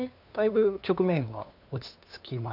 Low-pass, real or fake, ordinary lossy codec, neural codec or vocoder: 5.4 kHz; fake; none; codec, 16 kHz, 2 kbps, FunCodec, trained on LibriTTS, 25 frames a second